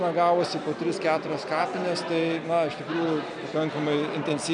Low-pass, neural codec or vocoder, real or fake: 9.9 kHz; none; real